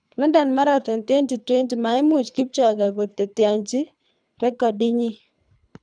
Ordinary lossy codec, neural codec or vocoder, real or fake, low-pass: none; codec, 24 kHz, 3 kbps, HILCodec; fake; 9.9 kHz